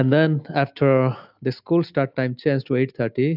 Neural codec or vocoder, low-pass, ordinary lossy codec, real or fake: none; 5.4 kHz; AAC, 48 kbps; real